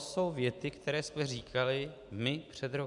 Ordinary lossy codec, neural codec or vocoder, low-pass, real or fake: MP3, 96 kbps; none; 10.8 kHz; real